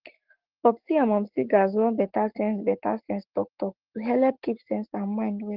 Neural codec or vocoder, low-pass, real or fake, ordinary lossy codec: codec, 44.1 kHz, 7.8 kbps, Pupu-Codec; 5.4 kHz; fake; Opus, 16 kbps